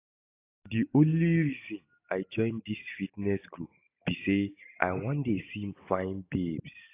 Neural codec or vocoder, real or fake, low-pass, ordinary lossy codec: none; real; 3.6 kHz; AAC, 24 kbps